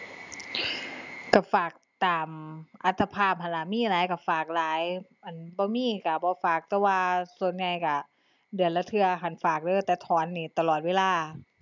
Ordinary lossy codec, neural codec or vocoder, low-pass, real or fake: none; none; 7.2 kHz; real